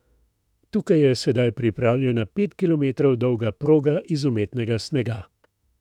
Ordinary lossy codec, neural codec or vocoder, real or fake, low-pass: none; autoencoder, 48 kHz, 32 numbers a frame, DAC-VAE, trained on Japanese speech; fake; 19.8 kHz